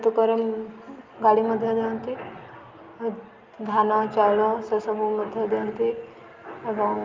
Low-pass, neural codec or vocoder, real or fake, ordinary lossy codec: 7.2 kHz; none; real; Opus, 24 kbps